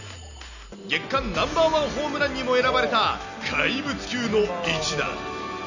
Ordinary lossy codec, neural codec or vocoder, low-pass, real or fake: none; none; 7.2 kHz; real